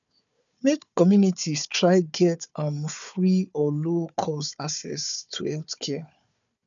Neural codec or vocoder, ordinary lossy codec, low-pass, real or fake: codec, 16 kHz, 4 kbps, FunCodec, trained on Chinese and English, 50 frames a second; none; 7.2 kHz; fake